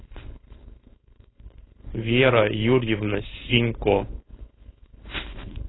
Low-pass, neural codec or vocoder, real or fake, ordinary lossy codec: 7.2 kHz; codec, 16 kHz, 4.8 kbps, FACodec; fake; AAC, 16 kbps